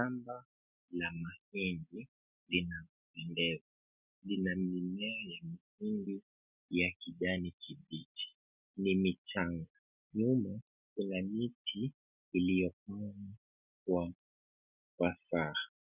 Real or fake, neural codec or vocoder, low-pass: real; none; 3.6 kHz